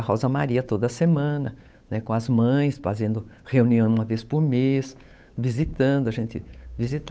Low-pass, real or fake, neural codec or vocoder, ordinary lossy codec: none; fake; codec, 16 kHz, 8 kbps, FunCodec, trained on Chinese and English, 25 frames a second; none